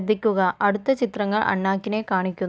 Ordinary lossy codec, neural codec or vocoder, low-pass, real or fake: none; none; none; real